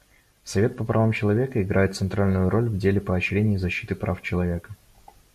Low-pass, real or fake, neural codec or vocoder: 14.4 kHz; real; none